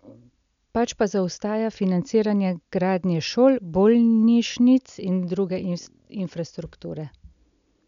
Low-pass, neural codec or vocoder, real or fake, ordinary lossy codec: 7.2 kHz; none; real; none